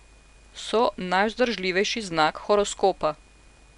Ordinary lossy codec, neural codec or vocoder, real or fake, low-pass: none; none; real; 10.8 kHz